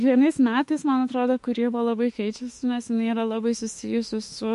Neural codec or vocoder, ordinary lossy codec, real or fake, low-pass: autoencoder, 48 kHz, 32 numbers a frame, DAC-VAE, trained on Japanese speech; MP3, 48 kbps; fake; 14.4 kHz